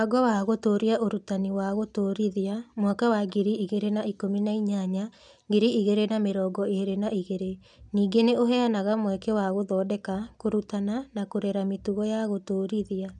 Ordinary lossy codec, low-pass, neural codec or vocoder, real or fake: none; 10.8 kHz; none; real